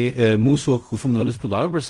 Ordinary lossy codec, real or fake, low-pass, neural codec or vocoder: Opus, 24 kbps; fake; 10.8 kHz; codec, 16 kHz in and 24 kHz out, 0.4 kbps, LongCat-Audio-Codec, fine tuned four codebook decoder